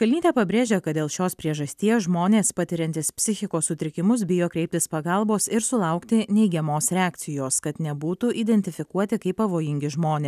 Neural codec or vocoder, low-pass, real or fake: none; 14.4 kHz; real